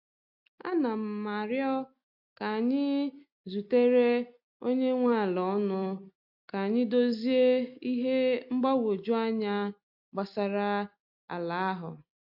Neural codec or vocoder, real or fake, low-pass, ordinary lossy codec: none; real; 5.4 kHz; AAC, 48 kbps